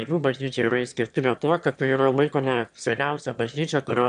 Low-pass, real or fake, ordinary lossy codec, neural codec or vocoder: 9.9 kHz; fake; AAC, 96 kbps; autoencoder, 22.05 kHz, a latent of 192 numbers a frame, VITS, trained on one speaker